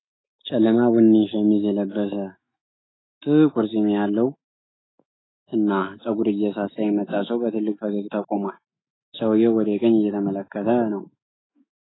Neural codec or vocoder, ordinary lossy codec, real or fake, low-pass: autoencoder, 48 kHz, 128 numbers a frame, DAC-VAE, trained on Japanese speech; AAC, 16 kbps; fake; 7.2 kHz